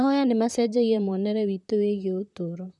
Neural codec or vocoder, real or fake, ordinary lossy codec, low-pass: vocoder, 44.1 kHz, 128 mel bands, Pupu-Vocoder; fake; none; 10.8 kHz